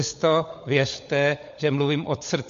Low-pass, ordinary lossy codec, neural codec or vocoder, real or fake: 7.2 kHz; MP3, 48 kbps; codec, 16 kHz, 16 kbps, FunCodec, trained on LibriTTS, 50 frames a second; fake